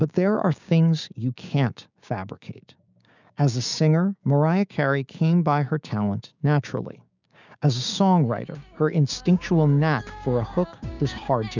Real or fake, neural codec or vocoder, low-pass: fake; codec, 16 kHz, 6 kbps, DAC; 7.2 kHz